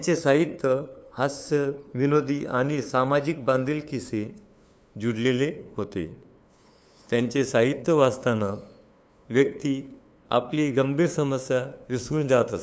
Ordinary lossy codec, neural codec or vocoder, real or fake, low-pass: none; codec, 16 kHz, 2 kbps, FunCodec, trained on LibriTTS, 25 frames a second; fake; none